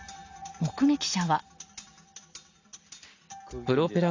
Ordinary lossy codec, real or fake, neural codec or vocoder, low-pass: AAC, 48 kbps; real; none; 7.2 kHz